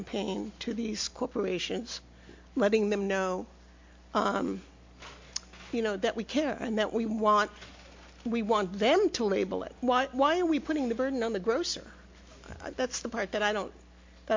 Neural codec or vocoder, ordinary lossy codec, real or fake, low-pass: none; MP3, 48 kbps; real; 7.2 kHz